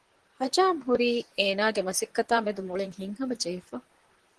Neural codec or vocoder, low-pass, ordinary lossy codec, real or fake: vocoder, 44.1 kHz, 128 mel bands, Pupu-Vocoder; 10.8 kHz; Opus, 16 kbps; fake